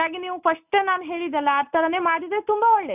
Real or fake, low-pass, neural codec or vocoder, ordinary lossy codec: real; 3.6 kHz; none; none